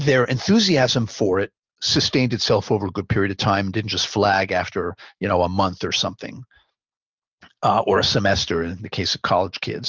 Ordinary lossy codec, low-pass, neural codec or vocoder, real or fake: Opus, 32 kbps; 7.2 kHz; none; real